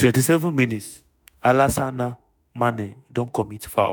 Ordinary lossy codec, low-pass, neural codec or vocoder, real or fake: none; none; autoencoder, 48 kHz, 32 numbers a frame, DAC-VAE, trained on Japanese speech; fake